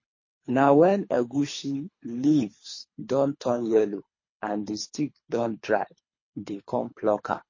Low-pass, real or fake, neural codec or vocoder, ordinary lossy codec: 7.2 kHz; fake; codec, 24 kHz, 3 kbps, HILCodec; MP3, 32 kbps